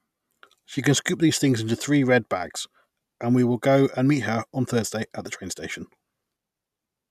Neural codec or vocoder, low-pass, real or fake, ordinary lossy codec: none; 14.4 kHz; real; none